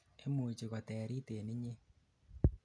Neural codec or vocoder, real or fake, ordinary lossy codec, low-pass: none; real; none; 9.9 kHz